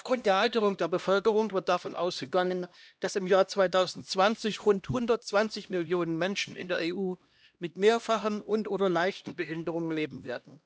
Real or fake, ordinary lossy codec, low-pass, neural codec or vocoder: fake; none; none; codec, 16 kHz, 1 kbps, X-Codec, HuBERT features, trained on LibriSpeech